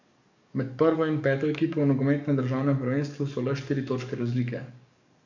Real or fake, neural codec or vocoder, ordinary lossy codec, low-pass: fake; codec, 44.1 kHz, 7.8 kbps, DAC; none; 7.2 kHz